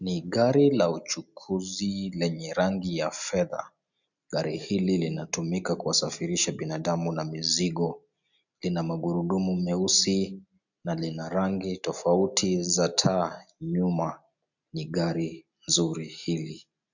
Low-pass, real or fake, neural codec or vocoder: 7.2 kHz; real; none